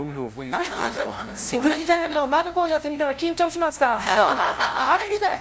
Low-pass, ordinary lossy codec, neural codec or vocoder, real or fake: none; none; codec, 16 kHz, 0.5 kbps, FunCodec, trained on LibriTTS, 25 frames a second; fake